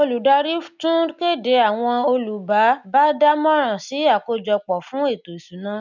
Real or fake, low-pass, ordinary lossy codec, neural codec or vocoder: real; 7.2 kHz; none; none